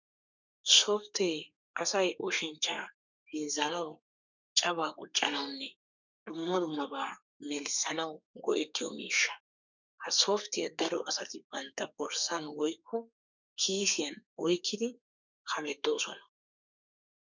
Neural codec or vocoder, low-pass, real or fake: codec, 32 kHz, 1.9 kbps, SNAC; 7.2 kHz; fake